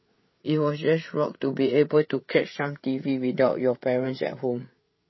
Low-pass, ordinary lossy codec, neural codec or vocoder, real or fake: 7.2 kHz; MP3, 24 kbps; codec, 16 kHz, 8 kbps, FreqCodec, larger model; fake